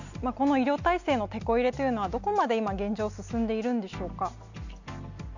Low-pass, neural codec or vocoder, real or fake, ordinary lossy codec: 7.2 kHz; none; real; none